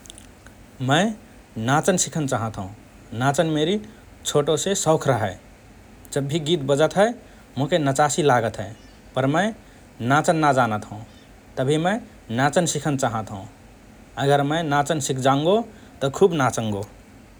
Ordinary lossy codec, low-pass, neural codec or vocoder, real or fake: none; none; none; real